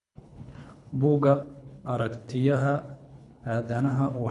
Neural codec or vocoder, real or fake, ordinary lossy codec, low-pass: codec, 24 kHz, 3 kbps, HILCodec; fake; none; 10.8 kHz